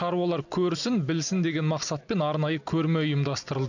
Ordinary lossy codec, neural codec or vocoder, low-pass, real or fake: none; none; 7.2 kHz; real